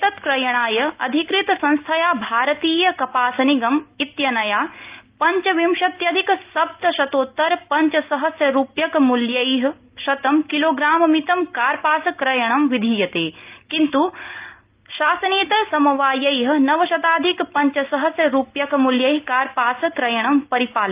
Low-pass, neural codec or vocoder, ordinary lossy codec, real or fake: 3.6 kHz; none; Opus, 24 kbps; real